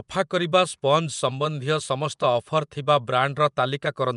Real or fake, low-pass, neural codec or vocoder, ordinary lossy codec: fake; 10.8 kHz; vocoder, 24 kHz, 100 mel bands, Vocos; MP3, 96 kbps